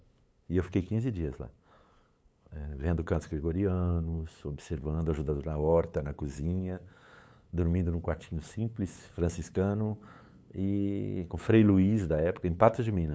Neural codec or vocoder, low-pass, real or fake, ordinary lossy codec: codec, 16 kHz, 8 kbps, FunCodec, trained on LibriTTS, 25 frames a second; none; fake; none